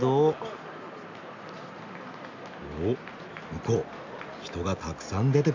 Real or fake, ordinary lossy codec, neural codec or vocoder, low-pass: real; AAC, 48 kbps; none; 7.2 kHz